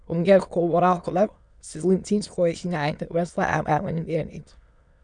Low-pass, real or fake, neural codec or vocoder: 9.9 kHz; fake; autoencoder, 22.05 kHz, a latent of 192 numbers a frame, VITS, trained on many speakers